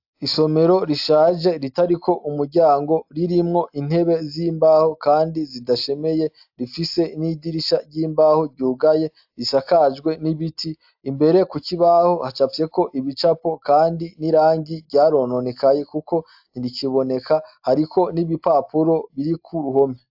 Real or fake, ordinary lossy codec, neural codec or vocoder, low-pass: real; AAC, 48 kbps; none; 5.4 kHz